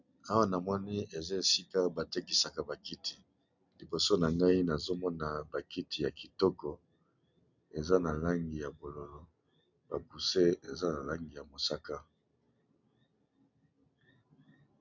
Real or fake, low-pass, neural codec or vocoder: real; 7.2 kHz; none